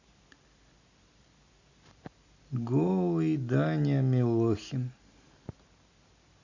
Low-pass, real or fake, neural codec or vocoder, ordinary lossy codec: 7.2 kHz; real; none; Opus, 64 kbps